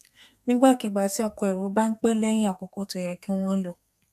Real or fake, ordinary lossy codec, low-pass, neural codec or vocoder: fake; AAC, 96 kbps; 14.4 kHz; codec, 32 kHz, 1.9 kbps, SNAC